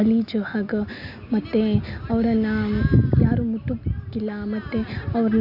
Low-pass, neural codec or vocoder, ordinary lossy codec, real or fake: 5.4 kHz; none; none; real